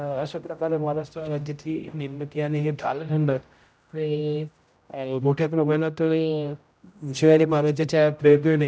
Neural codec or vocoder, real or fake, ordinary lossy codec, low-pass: codec, 16 kHz, 0.5 kbps, X-Codec, HuBERT features, trained on general audio; fake; none; none